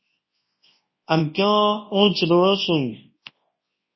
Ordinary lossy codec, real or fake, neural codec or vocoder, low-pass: MP3, 24 kbps; fake; codec, 24 kHz, 0.9 kbps, WavTokenizer, large speech release; 7.2 kHz